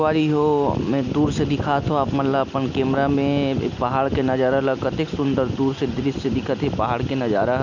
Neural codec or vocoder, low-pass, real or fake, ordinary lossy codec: none; 7.2 kHz; real; none